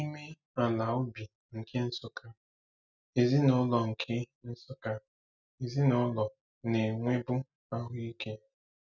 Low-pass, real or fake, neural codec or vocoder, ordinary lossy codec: 7.2 kHz; real; none; none